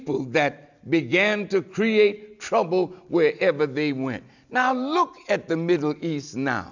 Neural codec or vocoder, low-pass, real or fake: none; 7.2 kHz; real